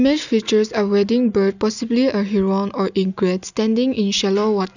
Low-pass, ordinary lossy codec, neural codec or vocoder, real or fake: 7.2 kHz; none; none; real